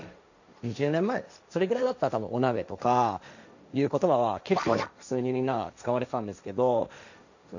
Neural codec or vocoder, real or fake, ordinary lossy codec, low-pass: codec, 16 kHz, 1.1 kbps, Voila-Tokenizer; fake; none; 7.2 kHz